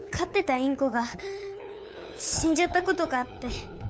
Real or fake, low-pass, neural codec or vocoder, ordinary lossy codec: fake; none; codec, 16 kHz, 8 kbps, FunCodec, trained on LibriTTS, 25 frames a second; none